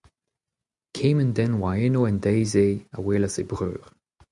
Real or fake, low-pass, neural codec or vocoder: real; 10.8 kHz; none